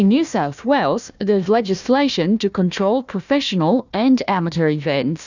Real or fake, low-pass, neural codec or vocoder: fake; 7.2 kHz; codec, 16 kHz, 1 kbps, FunCodec, trained on Chinese and English, 50 frames a second